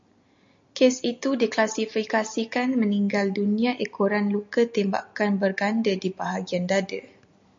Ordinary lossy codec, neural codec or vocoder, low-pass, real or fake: MP3, 48 kbps; none; 7.2 kHz; real